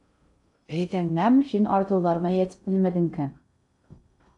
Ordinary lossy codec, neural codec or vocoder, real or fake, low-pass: AAC, 64 kbps; codec, 16 kHz in and 24 kHz out, 0.6 kbps, FocalCodec, streaming, 2048 codes; fake; 10.8 kHz